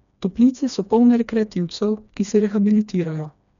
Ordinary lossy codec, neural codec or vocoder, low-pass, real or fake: none; codec, 16 kHz, 2 kbps, FreqCodec, smaller model; 7.2 kHz; fake